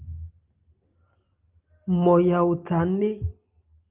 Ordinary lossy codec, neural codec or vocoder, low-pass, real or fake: Opus, 32 kbps; none; 3.6 kHz; real